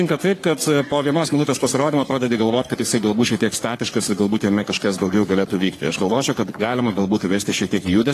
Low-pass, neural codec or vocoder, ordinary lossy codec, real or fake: 14.4 kHz; codec, 44.1 kHz, 3.4 kbps, Pupu-Codec; AAC, 48 kbps; fake